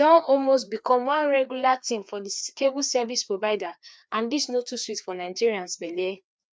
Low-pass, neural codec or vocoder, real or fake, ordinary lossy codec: none; codec, 16 kHz, 2 kbps, FreqCodec, larger model; fake; none